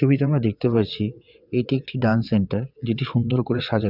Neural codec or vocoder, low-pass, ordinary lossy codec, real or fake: vocoder, 22.05 kHz, 80 mel bands, WaveNeXt; 5.4 kHz; none; fake